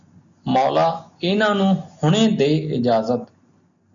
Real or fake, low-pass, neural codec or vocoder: real; 7.2 kHz; none